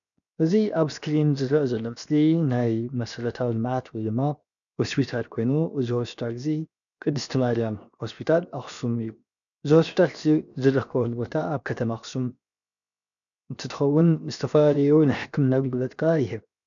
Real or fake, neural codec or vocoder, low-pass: fake; codec, 16 kHz, 0.7 kbps, FocalCodec; 7.2 kHz